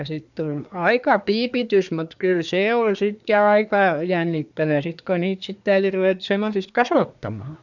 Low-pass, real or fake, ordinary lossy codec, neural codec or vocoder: 7.2 kHz; fake; none; codec, 24 kHz, 1 kbps, SNAC